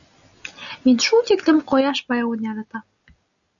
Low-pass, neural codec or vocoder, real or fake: 7.2 kHz; none; real